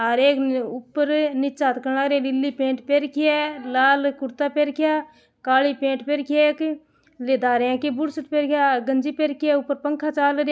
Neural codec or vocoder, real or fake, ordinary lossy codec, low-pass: none; real; none; none